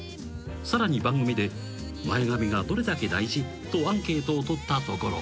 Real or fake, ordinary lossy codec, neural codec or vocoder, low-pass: real; none; none; none